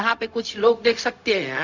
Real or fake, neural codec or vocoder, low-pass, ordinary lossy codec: fake; codec, 16 kHz, 0.4 kbps, LongCat-Audio-Codec; 7.2 kHz; none